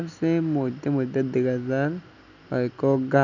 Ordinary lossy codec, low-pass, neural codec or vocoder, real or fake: none; 7.2 kHz; none; real